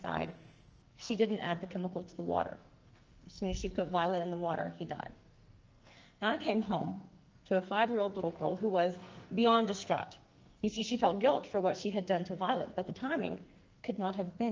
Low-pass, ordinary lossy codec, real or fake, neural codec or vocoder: 7.2 kHz; Opus, 24 kbps; fake; codec, 44.1 kHz, 2.6 kbps, SNAC